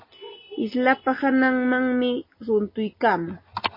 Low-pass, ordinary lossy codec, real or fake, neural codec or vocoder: 5.4 kHz; MP3, 24 kbps; real; none